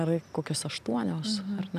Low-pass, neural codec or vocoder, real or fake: 14.4 kHz; none; real